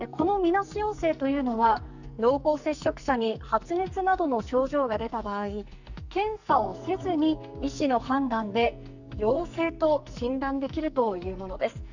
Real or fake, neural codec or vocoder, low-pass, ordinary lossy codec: fake; codec, 44.1 kHz, 2.6 kbps, SNAC; 7.2 kHz; none